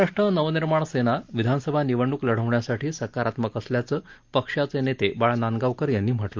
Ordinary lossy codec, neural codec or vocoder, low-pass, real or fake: Opus, 24 kbps; none; 7.2 kHz; real